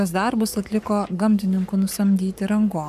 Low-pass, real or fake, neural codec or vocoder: 14.4 kHz; fake; codec, 44.1 kHz, 7.8 kbps, Pupu-Codec